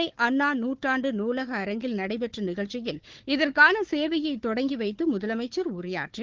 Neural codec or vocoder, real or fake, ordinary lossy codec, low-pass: codec, 16 kHz, 4 kbps, FunCodec, trained on Chinese and English, 50 frames a second; fake; Opus, 16 kbps; 7.2 kHz